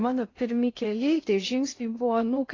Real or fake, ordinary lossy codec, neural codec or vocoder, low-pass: fake; AAC, 32 kbps; codec, 16 kHz in and 24 kHz out, 0.6 kbps, FocalCodec, streaming, 2048 codes; 7.2 kHz